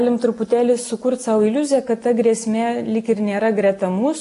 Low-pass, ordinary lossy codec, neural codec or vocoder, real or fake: 10.8 kHz; AAC, 48 kbps; none; real